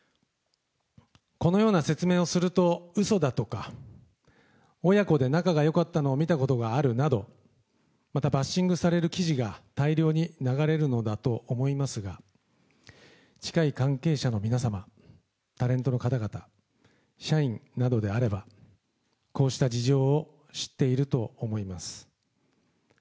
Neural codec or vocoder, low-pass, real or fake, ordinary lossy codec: none; none; real; none